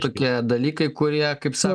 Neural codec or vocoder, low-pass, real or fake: none; 9.9 kHz; real